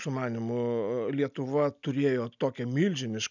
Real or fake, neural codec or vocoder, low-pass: real; none; 7.2 kHz